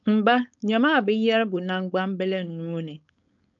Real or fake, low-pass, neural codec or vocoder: fake; 7.2 kHz; codec, 16 kHz, 4.8 kbps, FACodec